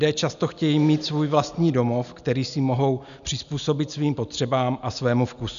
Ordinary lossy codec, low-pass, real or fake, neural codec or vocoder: MP3, 96 kbps; 7.2 kHz; real; none